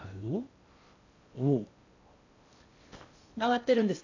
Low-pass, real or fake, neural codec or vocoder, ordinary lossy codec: 7.2 kHz; fake; codec, 16 kHz in and 24 kHz out, 0.6 kbps, FocalCodec, streaming, 4096 codes; AAC, 48 kbps